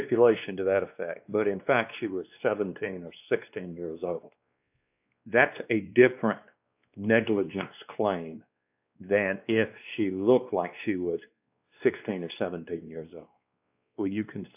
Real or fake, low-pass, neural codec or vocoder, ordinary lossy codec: fake; 3.6 kHz; codec, 16 kHz, 2 kbps, X-Codec, WavLM features, trained on Multilingual LibriSpeech; AAC, 32 kbps